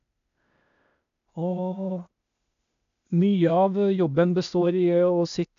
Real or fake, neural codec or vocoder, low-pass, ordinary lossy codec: fake; codec, 16 kHz, 0.8 kbps, ZipCodec; 7.2 kHz; none